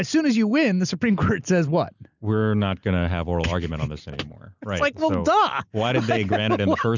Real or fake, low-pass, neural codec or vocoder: real; 7.2 kHz; none